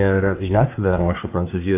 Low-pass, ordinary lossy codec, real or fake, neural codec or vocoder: 3.6 kHz; AAC, 32 kbps; fake; codec, 24 kHz, 1 kbps, SNAC